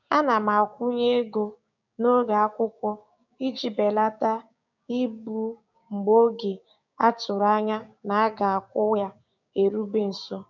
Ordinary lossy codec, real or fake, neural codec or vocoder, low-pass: none; fake; codec, 16 kHz, 6 kbps, DAC; 7.2 kHz